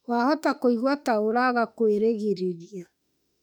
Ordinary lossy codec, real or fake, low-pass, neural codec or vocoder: none; fake; 19.8 kHz; autoencoder, 48 kHz, 32 numbers a frame, DAC-VAE, trained on Japanese speech